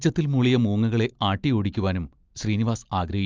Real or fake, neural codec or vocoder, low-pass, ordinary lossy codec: real; none; 7.2 kHz; Opus, 24 kbps